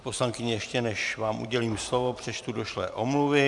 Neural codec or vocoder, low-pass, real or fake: vocoder, 44.1 kHz, 128 mel bands every 512 samples, BigVGAN v2; 10.8 kHz; fake